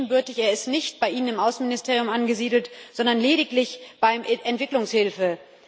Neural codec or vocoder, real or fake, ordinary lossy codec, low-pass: none; real; none; none